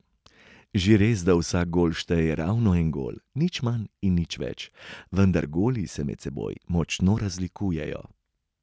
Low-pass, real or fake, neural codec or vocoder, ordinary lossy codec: none; real; none; none